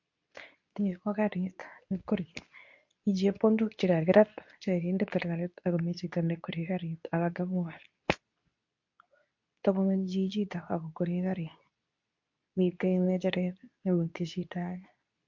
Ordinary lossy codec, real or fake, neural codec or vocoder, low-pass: MP3, 48 kbps; fake; codec, 24 kHz, 0.9 kbps, WavTokenizer, medium speech release version 2; 7.2 kHz